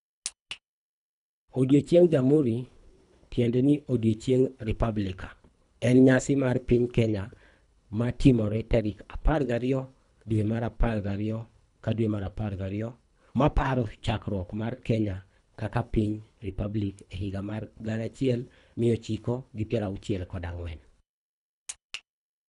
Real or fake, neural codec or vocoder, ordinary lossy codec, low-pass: fake; codec, 24 kHz, 3 kbps, HILCodec; none; 10.8 kHz